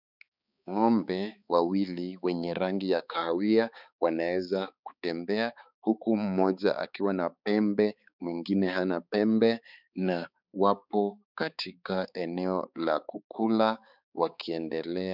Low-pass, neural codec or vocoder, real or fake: 5.4 kHz; codec, 16 kHz, 4 kbps, X-Codec, HuBERT features, trained on balanced general audio; fake